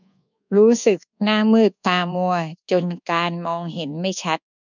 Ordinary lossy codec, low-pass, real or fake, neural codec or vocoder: none; 7.2 kHz; fake; codec, 24 kHz, 1.2 kbps, DualCodec